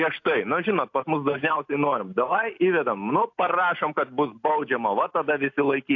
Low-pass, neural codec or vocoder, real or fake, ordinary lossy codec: 7.2 kHz; none; real; AAC, 48 kbps